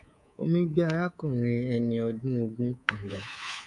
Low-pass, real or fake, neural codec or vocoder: 10.8 kHz; fake; codec, 24 kHz, 3.1 kbps, DualCodec